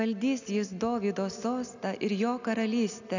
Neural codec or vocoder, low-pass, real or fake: none; 7.2 kHz; real